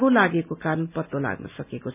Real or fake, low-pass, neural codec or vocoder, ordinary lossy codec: real; 3.6 kHz; none; none